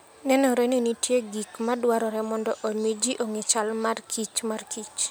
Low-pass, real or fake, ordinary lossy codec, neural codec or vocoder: none; real; none; none